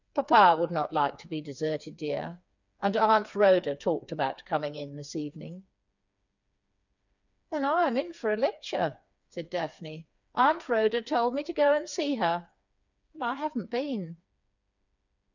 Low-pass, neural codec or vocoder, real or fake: 7.2 kHz; codec, 16 kHz, 4 kbps, FreqCodec, smaller model; fake